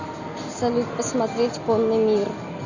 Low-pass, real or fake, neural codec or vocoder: 7.2 kHz; real; none